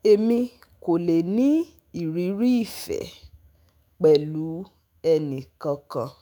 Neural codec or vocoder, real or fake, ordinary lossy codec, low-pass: autoencoder, 48 kHz, 128 numbers a frame, DAC-VAE, trained on Japanese speech; fake; none; none